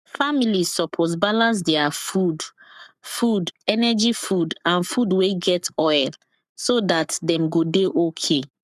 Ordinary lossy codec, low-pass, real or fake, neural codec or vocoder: none; 14.4 kHz; fake; codec, 44.1 kHz, 7.8 kbps, Pupu-Codec